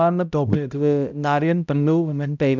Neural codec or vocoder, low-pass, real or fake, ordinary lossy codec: codec, 16 kHz, 0.5 kbps, X-Codec, HuBERT features, trained on balanced general audio; 7.2 kHz; fake; none